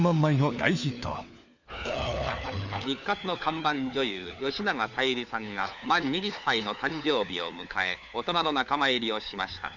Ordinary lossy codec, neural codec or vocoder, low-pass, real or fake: none; codec, 16 kHz, 4 kbps, FunCodec, trained on LibriTTS, 50 frames a second; 7.2 kHz; fake